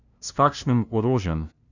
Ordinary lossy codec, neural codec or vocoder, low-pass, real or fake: none; codec, 16 kHz, 0.5 kbps, FunCodec, trained on LibriTTS, 25 frames a second; 7.2 kHz; fake